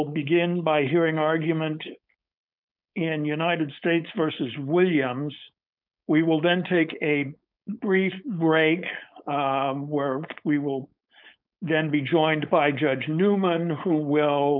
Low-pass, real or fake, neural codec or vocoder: 5.4 kHz; fake; codec, 16 kHz, 4.8 kbps, FACodec